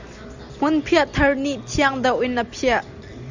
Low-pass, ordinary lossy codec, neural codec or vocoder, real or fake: 7.2 kHz; Opus, 64 kbps; none; real